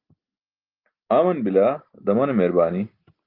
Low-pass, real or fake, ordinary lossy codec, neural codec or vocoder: 5.4 kHz; real; Opus, 24 kbps; none